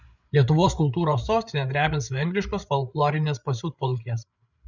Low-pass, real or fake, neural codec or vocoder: 7.2 kHz; fake; codec, 16 kHz, 8 kbps, FreqCodec, larger model